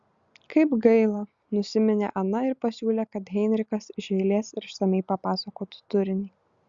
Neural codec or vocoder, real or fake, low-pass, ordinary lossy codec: none; real; 7.2 kHz; Opus, 64 kbps